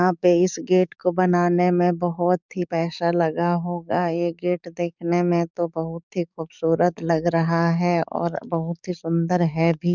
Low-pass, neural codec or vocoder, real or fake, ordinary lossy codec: 7.2 kHz; codec, 44.1 kHz, 7.8 kbps, DAC; fake; none